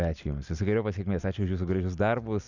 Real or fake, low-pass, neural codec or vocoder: real; 7.2 kHz; none